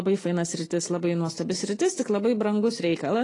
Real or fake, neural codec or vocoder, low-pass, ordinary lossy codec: real; none; 10.8 kHz; AAC, 32 kbps